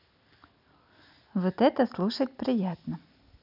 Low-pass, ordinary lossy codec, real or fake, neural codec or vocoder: 5.4 kHz; none; real; none